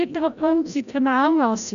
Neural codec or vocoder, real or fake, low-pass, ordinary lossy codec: codec, 16 kHz, 0.5 kbps, FreqCodec, larger model; fake; 7.2 kHz; none